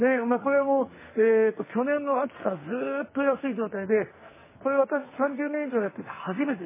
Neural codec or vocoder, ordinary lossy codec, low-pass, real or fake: codec, 44.1 kHz, 2.6 kbps, SNAC; MP3, 16 kbps; 3.6 kHz; fake